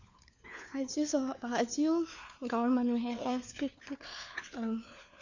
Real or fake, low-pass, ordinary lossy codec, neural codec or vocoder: fake; 7.2 kHz; none; codec, 16 kHz, 4 kbps, FunCodec, trained on LibriTTS, 50 frames a second